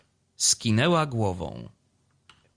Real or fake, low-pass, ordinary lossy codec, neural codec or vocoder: real; 9.9 kHz; Opus, 64 kbps; none